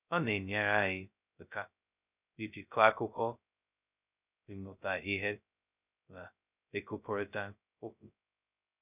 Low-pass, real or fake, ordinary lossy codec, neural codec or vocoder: 3.6 kHz; fake; none; codec, 16 kHz, 0.2 kbps, FocalCodec